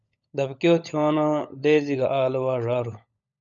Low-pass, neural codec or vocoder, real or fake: 7.2 kHz; codec, 16 kHz, 16 kbps, FunCodec, trained on LibriTTS, 50 frames a second; fake